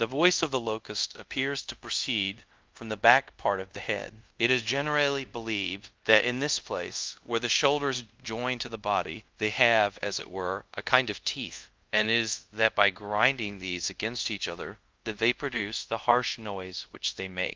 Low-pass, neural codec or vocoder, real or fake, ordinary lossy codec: 7.2 kHz; codec, 24 kHz, 0.5 kbps, DualCodec; fake; Opus, 32 kbps